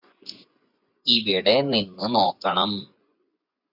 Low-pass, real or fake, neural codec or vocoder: 5.4 kHz; fake; vocoder, 24 kHz, 100 mel bands, Vocos